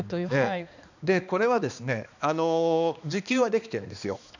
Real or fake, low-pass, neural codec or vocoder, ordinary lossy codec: fake; 7.2 kHz; codec, 16 kHz, 2 kbps, X-Codec, HuBERT features, trained on balanced general audio; none